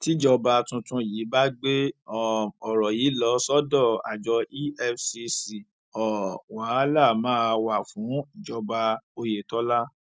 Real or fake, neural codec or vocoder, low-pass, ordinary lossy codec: real; none; none; none